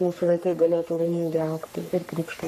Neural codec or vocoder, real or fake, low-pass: codec, 44.1 kHz, 3.4 kbps, Pupu-Codec; fake; 14.4 kHz